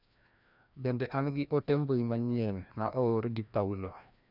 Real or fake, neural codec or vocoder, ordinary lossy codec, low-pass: fake; codec, 16 kHz, 1 kbps, FreqCodec, larger model; none; 5.4 kHz